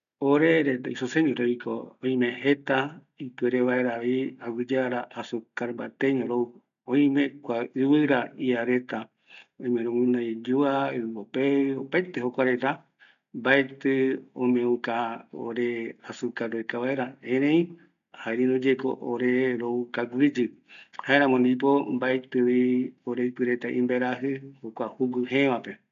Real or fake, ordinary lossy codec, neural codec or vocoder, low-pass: real; none; none; 7.2 kHz